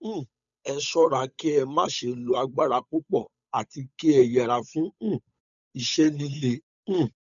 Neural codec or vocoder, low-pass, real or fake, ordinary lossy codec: codec, 16 kHz, 8 kbps, FunCodec, trained on Chinese and English, 25 frames a second; 7.2 kHz; fake; none